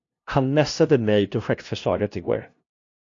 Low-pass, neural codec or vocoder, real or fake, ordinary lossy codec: 7.2 kHz; codec, 16 kHz, 0.5 kbps, FunCodec, trained on LibriTTS, 25 frames a second; fake; AAC, 48 kbps